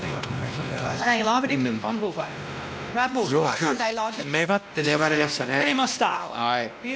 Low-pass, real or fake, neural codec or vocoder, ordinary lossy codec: none; fake; codec, 16 kHz, 1 kbps, X-Codec, WavLM features, trained on Multilingual LibriSpeech; none